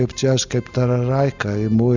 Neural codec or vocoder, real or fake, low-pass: none; real; 7.2 kHz